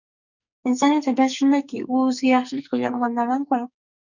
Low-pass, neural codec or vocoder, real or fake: 7.2 kHz; codec, 44.1 kHz, 2.6 kbps, SNAC; fake